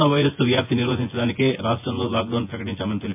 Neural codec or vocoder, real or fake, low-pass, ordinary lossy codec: vocoder, 24 kHz, 100 mel bands, Vocos; fake; 3.6 kHz; MP3, 32 kbps